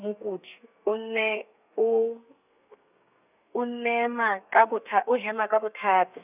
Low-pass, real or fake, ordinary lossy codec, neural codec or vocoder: 3.6 kHz; fake; none; codec, 32 kHz, 1.9 kbps, SNAC